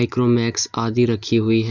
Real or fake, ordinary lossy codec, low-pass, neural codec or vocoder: real; none; 7.2 kHz; none